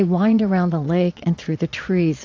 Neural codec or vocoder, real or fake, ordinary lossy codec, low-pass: vocoder, 22.05 kHz, 80 mel bands, Vocos; fake; AAC, 48 kbps; 7.2 kHz